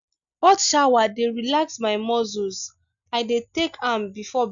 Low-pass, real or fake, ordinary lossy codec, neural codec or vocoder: 7.2 kHz; real; none; none